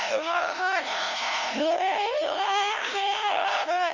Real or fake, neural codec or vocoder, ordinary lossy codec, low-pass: fake; codec, 16 kHz, 0.5 kbps, FunCodec, trained on LibriTTS, 25 frames a second; none; 7.2 kHz